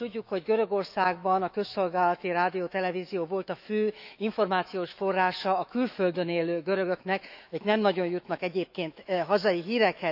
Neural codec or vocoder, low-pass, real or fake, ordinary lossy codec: autoencoder, 48 kHz, 128 numbers a frame, DAC-VAE, trained on Japanese speech; 5.4 kHz; fake; none